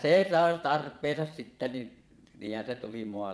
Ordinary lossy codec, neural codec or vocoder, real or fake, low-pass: none; vocoder, 22.05 kHz, 80 mel bands, Vocos; fake; none